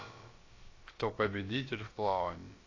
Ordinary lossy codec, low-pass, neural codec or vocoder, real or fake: AAC, 32 kbps; 7.2 kHz; codec, 16 kHz, about 1 kbps, DyCAST, with the encoder's durations; fake